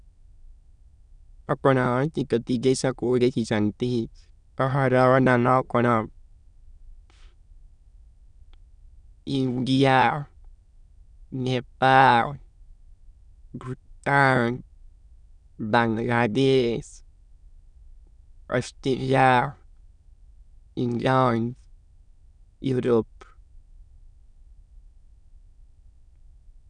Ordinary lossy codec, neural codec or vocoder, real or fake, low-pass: none; autoencoder, 22.05 kHz, a latent of 192 numbers a frame, VITS, trained on many speakers; fake; 9.9 kHz